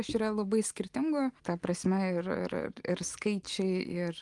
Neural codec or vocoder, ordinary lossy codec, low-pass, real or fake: none; Opus, 24 kbps; 10.8 kHz; real